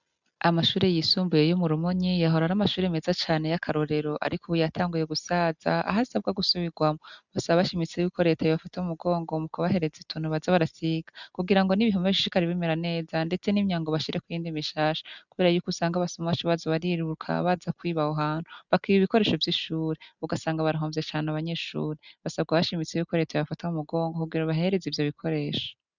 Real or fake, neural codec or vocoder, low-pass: real; none; 7.2 kHz